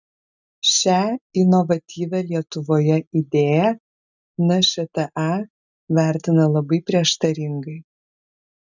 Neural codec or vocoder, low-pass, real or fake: none; 7.2 kHz; real